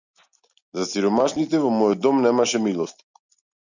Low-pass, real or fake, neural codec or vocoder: 7.2 kHz; real; none